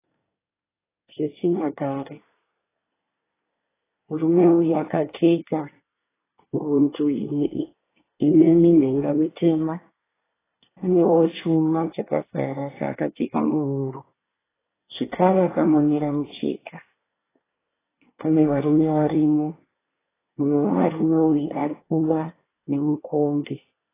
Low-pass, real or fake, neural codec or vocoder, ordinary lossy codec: 3.6 kHz; fake; codec, 24 kHz, 1 kbps, SNAC; AAC, 16 kbps